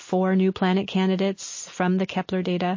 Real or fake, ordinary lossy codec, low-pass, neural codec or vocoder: real; MP3, 32 kbps; 7.2 kHz; none